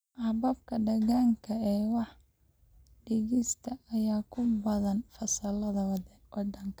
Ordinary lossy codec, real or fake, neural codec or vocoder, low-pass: none; real; none; none